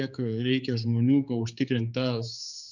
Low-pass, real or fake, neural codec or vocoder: 7.2 kHz; fake; codec, 16 kHz, 4 kbps, X-Codec, HuBERT features, trained on general audio